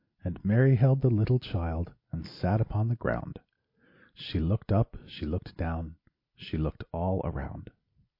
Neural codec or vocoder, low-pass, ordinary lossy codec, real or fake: none; 5.4 kHz; MP3, 32 kbps; real